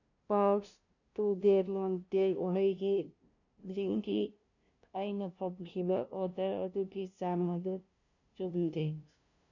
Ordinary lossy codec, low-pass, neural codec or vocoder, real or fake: none; 7.2 kHz; codec, 16 kHz, 0.5 kbps, FunCodec, trained on LibriTTS, 25 frames a second; fake